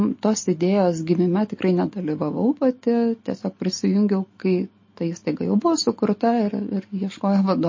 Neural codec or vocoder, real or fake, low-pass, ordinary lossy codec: none; real; 7.2 kHz; MP3, 32 kbps